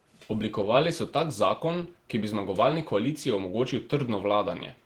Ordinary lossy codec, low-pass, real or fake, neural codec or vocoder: Opus, 16 kbps; 19.8 kHz; fake; vocoder, 48 kHz, 128 mel bands, Vocos